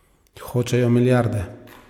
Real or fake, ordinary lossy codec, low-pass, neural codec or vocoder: real; MP3, 96 kbps; 19.8 kHz; none